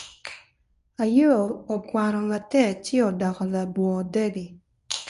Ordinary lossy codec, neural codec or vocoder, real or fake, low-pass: none; codec, 24 kHz, 0.9 kbps, WavTokenizer, medium speech release version 1; fake; 10.8 kHz